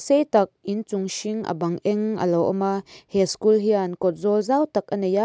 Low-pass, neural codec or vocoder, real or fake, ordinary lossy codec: none; none; real; none